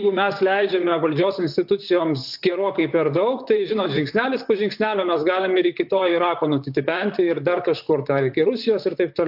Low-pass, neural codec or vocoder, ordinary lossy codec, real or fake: 5.4 kHz; vocoder, 44.1 kHz, 128 mel bands, Pupu-Vocoder; AAC, 48 kbps; fake